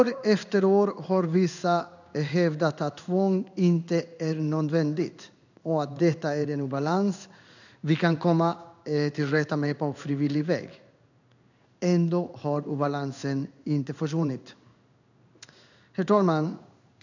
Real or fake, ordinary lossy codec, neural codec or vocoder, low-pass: fake; none; codec, 16 kHz in and 24 kHz out, 1 kbps, XY-Tokenizer; 7.2 kHz